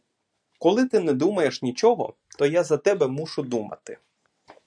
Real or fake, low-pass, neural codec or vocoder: real; 9.9 kHz; none